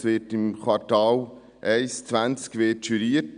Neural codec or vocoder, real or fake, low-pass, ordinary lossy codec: none; real; 9.9 kHz; none